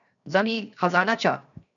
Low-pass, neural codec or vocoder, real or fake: 7.2 kHz; codec, 16 kHz, 0.7 kbps, FocalCodec; fake